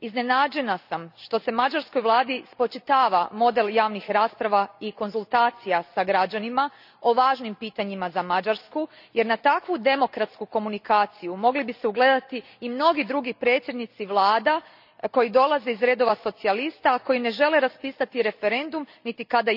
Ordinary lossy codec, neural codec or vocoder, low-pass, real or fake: none; none; 5.4 kHz; real